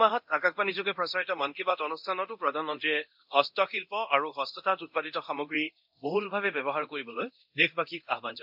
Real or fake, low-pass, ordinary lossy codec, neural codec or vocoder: fake; 5.4 kHz; MP3, 48 kbps; codec, 24 kHz, 0.9 kbps, DualCodec